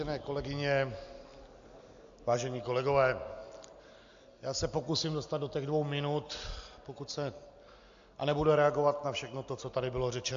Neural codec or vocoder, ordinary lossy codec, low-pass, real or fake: none; AAC, 64 kbps; 7.2 kHz; real